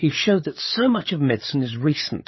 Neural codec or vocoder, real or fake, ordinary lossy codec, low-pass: codec, 16 kHz, 8 kbps, FreqCodec, larger model; fake; MP3, 24 kbps; 7.2 kHz